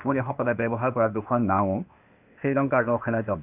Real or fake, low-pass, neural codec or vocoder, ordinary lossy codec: fake; 3.6 kHz; codec, 16 kHz, 0.8 kbps, ZipCodec; AAC, 32 kbps